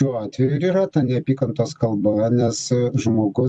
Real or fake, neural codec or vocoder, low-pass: fake; vocoder, 44.1 kHz, 128 mel bands every 256 samples, BigVGAN v2; 10.8 kHz